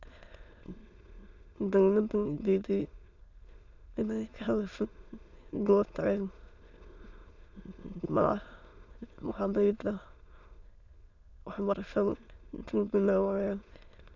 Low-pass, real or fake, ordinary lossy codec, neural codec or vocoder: 7.2 kHz; fake; none; autoencoder, 22.05 kHz, a latent of 192 numbers a frame, VITS, trained on many speakers